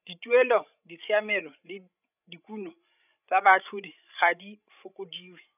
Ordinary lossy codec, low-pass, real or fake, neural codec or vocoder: none; 3.6 kHz; fake; codec, 16 kHz, 16 kbps, FreqCodec, larger model